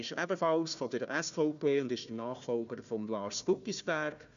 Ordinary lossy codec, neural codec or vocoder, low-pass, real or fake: none; codec, 16 kHz, 1 kbps, FunCodec, trained on Chinese and English, 50 frames a second; 7.2 kHz; fake